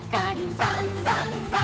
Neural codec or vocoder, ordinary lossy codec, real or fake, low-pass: codec, 16 kHz, 8 kbps, FunCodec, trained on Chinese and English, 25 frames a second; none; fake; none